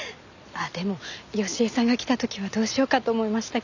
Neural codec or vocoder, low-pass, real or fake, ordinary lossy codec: none; 7.2 kHz; real; none